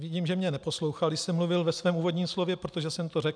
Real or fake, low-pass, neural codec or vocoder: real; 9.9 kHz; none